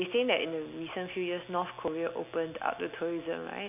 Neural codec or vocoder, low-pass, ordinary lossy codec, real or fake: none; 3.6 kHz; none; real